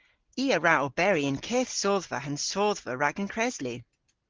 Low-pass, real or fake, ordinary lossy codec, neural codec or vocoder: 7.2 kHz; real; Opus, 16 kbps; none